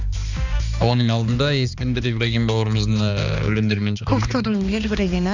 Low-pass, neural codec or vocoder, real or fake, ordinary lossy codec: 7.2 kHz; codec, 16 kHz, 2 kbps, X-Codec, HuBERT features, trained on balanced general audio; fake; none